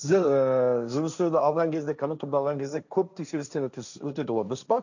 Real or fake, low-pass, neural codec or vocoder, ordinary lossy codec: fake; 7.2 kHz; codec, 16 kHz, 1.1 kbps, Voila-Tokenizer; none